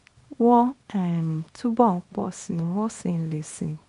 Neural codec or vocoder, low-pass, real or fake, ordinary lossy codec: codec, 24 kHz, 0.9 kbps, WavTokenizer, small release; 10.8 kHz; fake; MP3, 48 kbps